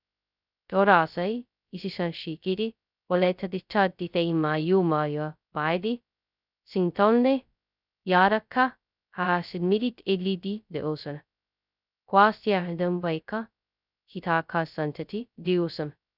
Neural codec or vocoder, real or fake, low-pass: codec, 16 kHz, 0.2 kbps, FocalCodec; fake; 5.4 kHz